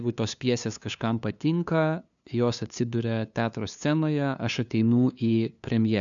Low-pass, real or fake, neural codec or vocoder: 7.2 kHz; fake; codec, 16 kHz, 2 kbps, FunCodec, trained on LibriTTS, 25 frames a second